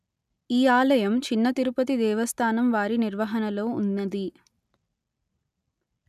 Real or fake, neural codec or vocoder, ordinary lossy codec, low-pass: real; none; none; 14.4 kHz